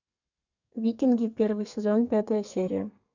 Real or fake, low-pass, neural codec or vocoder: fake; 7.2 kHz; codec, 32 kHz, 1.9 kbps, SNAC